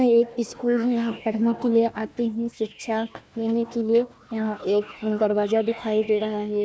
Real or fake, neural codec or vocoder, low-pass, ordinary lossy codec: fake; codec, 16 kHz, 1 kbps, FunCodec, trained on Chinese and English, 50 frames a second; none; none